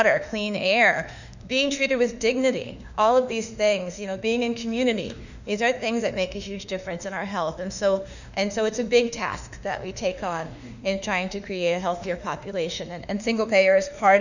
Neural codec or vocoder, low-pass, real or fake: autoencoder, 48 kHz, 32 numbers a frame, DAC-VAE, trained on Japanese speech; 7.2 kHz; fake